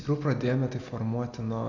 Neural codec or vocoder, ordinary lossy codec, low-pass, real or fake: none; AAC, 48 kbps; 7.2 kHz; real